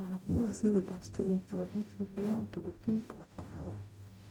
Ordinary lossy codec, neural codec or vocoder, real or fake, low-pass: MP3, 96 kbps; codec, 44.1 kHz, 0.9 kbps, DAC; fake; 19.8 kHz